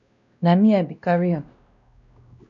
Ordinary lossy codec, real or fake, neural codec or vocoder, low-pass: MP3, 96 kbps; fake; codec, 16 kHz, 1 kbps, X-Codec, WavLM features, trained on Multilingual LibriSpeech; 7.2 kHz